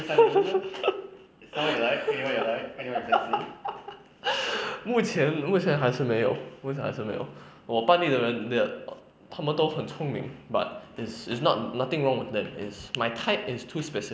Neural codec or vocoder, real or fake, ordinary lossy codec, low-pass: none; real; none; none